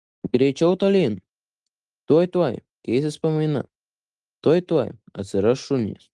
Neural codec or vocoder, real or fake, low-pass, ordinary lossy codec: none; real; 10.8 kHz; Opus, 24 kbps